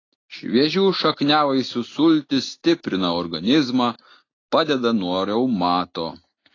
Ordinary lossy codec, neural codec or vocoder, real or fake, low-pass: AAC, 32 kbps; none; real; 7.2 kHz